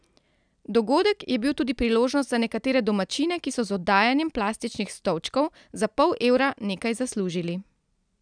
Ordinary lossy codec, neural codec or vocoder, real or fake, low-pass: none; none; real; 9.9 kHz